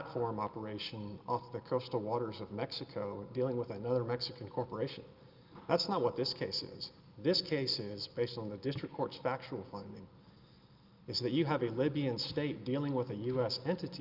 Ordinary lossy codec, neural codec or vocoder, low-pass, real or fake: Opus, 24 kbps; none; 5.4 kHz; real